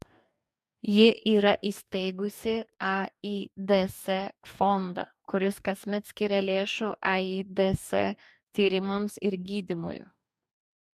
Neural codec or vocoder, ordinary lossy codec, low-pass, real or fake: codec, 44.1 kHz, 2.6 kbps, DAC; MP3, 96 kbps; 14.4 kHz; fake